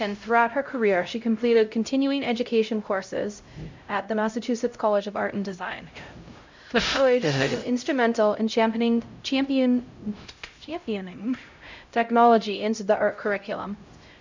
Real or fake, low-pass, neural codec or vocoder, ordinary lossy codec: fake; 7.2 kHz; codec, 16 kHz, 0.5 kbps, X-Codec, HuBERT features, trained on LibriSpeech; MP3, 64 kbps